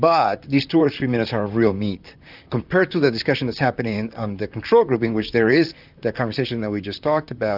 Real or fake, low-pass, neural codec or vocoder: fake; 5.4 kHz; vocoder, 44.1 kHz, 128 mel bands, Pupu-Vocoder